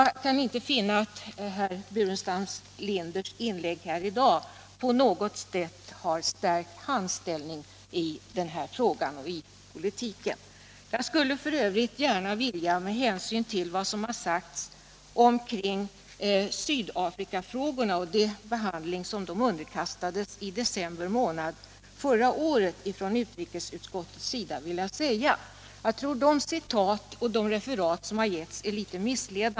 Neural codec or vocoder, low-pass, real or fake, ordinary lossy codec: none; none; real; none